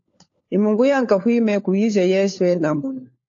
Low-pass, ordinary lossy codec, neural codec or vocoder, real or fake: 7.2 kHz; AAC, 48 kbps; codec, 16 kHz, 4 kbps, FunCodec, trained on LibriTTS, 50 frames a second; fake